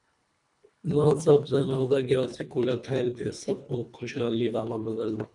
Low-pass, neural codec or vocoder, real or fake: 10.8 kHz; codec, 24 kHz, 1.5 kbps, HILCodec; fake